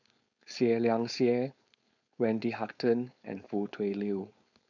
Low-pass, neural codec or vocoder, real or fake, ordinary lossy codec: 7.2 kHz; codec, 16 kHz, 4.8 kbps, FACodec; fake; none